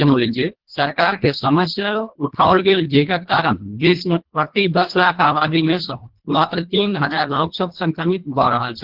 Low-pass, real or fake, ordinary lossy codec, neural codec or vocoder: 5.4 kHz; fake; Opus, 16 kbps; codec, 24 kHz, 1.5 kbps, HILCodec